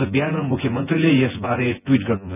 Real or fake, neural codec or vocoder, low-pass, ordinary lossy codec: fake; vocoder, 24 kHz, 100 mel bands, Vocos; 3.6 kHz; none